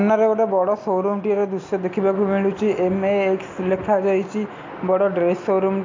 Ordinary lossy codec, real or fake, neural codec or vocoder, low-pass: MP3, 48 kbps; real; none; 7.2 kHz